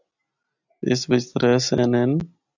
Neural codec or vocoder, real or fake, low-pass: none; real; 7.2 kHz